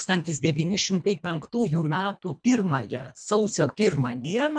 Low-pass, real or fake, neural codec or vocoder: 9.9 kHz; fake; codec, 24 kHz, 1.5 kbps, HILCodec